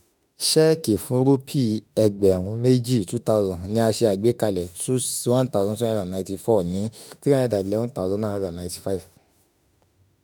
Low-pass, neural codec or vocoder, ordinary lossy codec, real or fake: none; autoencoder, 48 kHz, 32 numbers a frame, DAC-VAE, trained on Japanese speech; none; fake